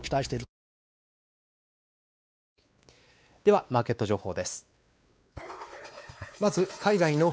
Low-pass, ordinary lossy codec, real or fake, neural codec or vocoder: none; none; fake; codec, 16 kHz, 2 kbps, X-Codec, WavLM features, trained on Multilingual LibriSpeech